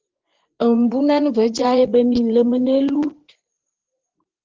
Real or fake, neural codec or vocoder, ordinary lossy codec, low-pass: fake; vocoder, 24 kHz, 100 mel bands, Vocos; Opus, 16 kbps; 7.2 kHz